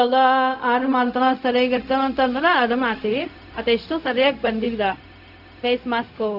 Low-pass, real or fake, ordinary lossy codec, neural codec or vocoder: 5.4 kHz; fake; none; codec, 16 kHz, 0.4 kbps, LongCat-Audio-Codec